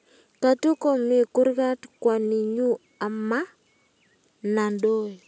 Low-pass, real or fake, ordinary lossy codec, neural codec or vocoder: none; real; none; none